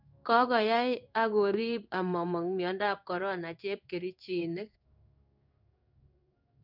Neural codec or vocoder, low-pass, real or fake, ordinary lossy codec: codec, 16 kHz in and 24 kHz out, 1 kbps, XY-Tokenizer; 5.4 kHz; fake; none